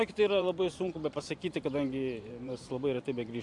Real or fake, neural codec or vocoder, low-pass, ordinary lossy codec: fake; vocoder, 44.1 kHz, 128 mel bands every 512 samples, BigVGAN v2; 10.8 kHz; AAC, 64 kbps